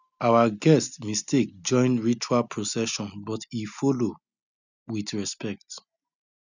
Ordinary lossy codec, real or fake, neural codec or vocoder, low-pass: none; real; none; 7.2 kHz